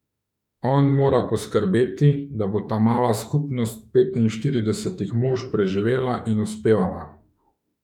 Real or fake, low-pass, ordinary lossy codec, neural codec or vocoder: fake; 19.8 kHz; none; autoencoder, 48 kHz, 32 numbers a frame, DAC-VAE, trained on Japanese speech